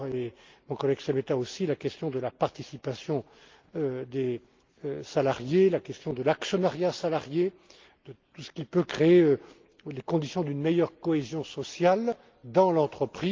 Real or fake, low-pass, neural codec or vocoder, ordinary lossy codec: real; 7.2 kHz; none; Opus, 32 kbps